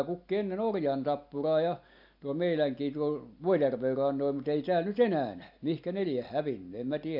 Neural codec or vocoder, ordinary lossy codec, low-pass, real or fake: none; AAC, 48 kbps; 5.4 kHz; real